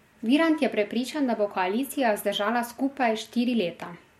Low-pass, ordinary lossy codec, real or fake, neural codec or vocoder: 19.8 kHz; MP3, 64 kbps; real; none